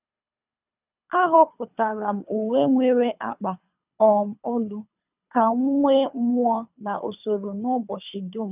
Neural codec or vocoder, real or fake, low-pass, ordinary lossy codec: codec, 24 kHz, 3 kbps, HILCodec; fake; 3.6 kHz; none